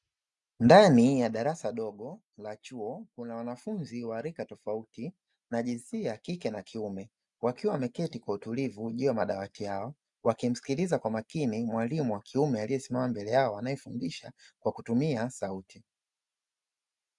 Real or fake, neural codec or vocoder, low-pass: real; none; 10.8 kHz